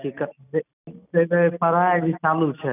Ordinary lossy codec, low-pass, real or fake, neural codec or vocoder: none; 3.6 kHz; real; none